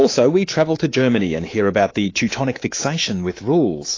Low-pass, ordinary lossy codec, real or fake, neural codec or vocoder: 7.2 kHz; AAC, 32 kbps; fake; codec, 24 kHz, 3.1 kbps, DualCodec